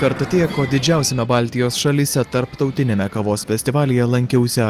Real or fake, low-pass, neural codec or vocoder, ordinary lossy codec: real; 14.4 kHz; none; Opus, 24 kbps